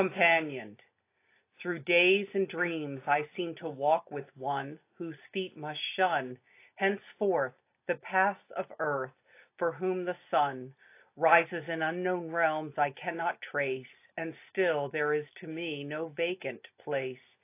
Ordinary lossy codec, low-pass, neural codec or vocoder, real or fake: AAC, 24 kbps; 3.6 kHz; none; real